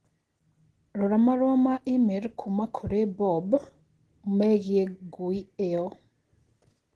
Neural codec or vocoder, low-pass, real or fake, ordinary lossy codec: none; 10.8 kHz; real; Opus, 16 kbps